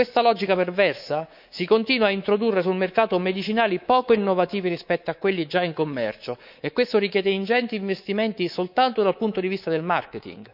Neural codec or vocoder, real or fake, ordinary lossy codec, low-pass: codec, 24 kHz, 3.1 kbps, DualCodec; fake; none; 5.4 kHz